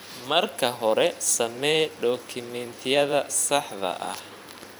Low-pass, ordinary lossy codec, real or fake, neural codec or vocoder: none; none; fake; vocoder, 44.1 kHz, 128 mel bands every 512 samples, BigVGAN v2